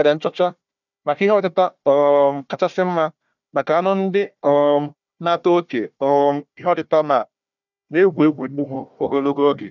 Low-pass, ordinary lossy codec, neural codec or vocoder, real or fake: 7.2 kHz; none; codec, 16 kHz, 1 kbps, FunCodec, trained on Chinese and English, 50 frames a second; fake